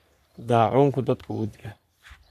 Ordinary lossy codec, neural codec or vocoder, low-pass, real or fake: none; codec, 44.1 kHz, 3.4 kbps, Pupu-Codec; 14.4 kHz; fake